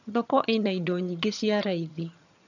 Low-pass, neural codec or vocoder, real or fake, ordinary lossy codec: 7.2 kHz; vocoder, 22.05 kHz, 80 mel bands, HiFi-GAN; fake; none